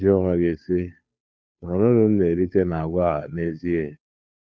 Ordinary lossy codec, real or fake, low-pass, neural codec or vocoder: none; fake; none; codec, 16 kHz, 2 kbps, FunCodec, trained on Chinese and English, 25 frames a second